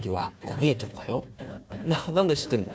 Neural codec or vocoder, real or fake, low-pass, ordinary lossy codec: codec, 16 kHz, 1 kbps, FunCodec, trained on Chinese and English, 50 frames a second; fake; none; none